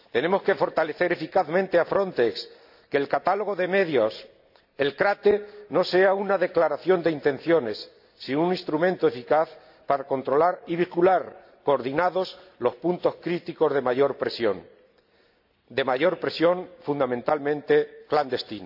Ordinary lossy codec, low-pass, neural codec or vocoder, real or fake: AAC, 48 kbps; 5.4 kHz; none; real